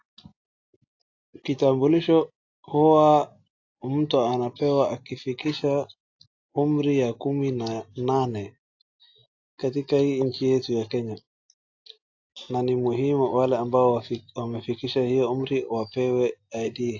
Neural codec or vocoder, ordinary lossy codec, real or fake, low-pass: none; AAC, 48 kbps; real; 7.2 kHz